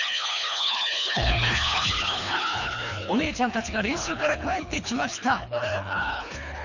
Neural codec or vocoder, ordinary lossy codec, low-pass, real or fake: codec, 24 kHz, 3 kbps, HILCodec; none; 7.2 kHz; fake